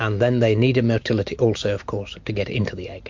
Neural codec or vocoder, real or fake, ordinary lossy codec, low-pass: none; real; MP3, 48 kbps; 7.2 kHz